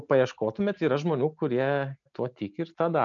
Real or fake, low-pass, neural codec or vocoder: real; 7.2 kHz; none